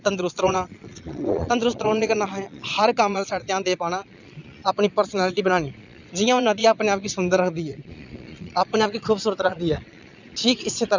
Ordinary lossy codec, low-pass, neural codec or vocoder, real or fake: none; 7.2 kHz; vocoder, 22.05 kHz, 80 mel bands, Vocos; fake